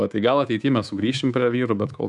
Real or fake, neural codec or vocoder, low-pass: fake; codec, 24 kHz, 3.1 kbps, DualCodec; 10.8 kHz